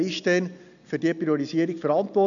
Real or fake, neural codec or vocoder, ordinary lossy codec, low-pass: real; none; none; 7.2 kHz